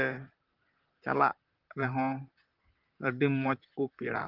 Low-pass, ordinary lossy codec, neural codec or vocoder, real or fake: 5.4 kHz; Opus, 32 kbps; vocoder, 44.1 kHz, 128 mel bands, Pupu-Vocoder; fake